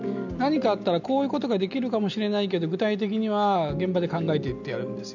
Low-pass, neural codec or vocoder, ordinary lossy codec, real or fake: 7.2 kHz; none; none; real